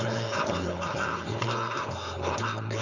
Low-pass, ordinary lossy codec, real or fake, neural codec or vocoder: 7.2 kHz; none; fake; codec, 16 kHz, 4.8 kbps, FACodec